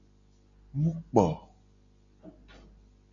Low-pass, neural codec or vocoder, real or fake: 7.2 kHz; none; real